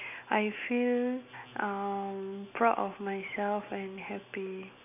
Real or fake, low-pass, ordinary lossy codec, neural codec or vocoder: real; 3.6 kHz; none; none